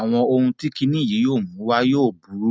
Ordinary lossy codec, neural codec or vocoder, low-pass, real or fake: none; none; none; real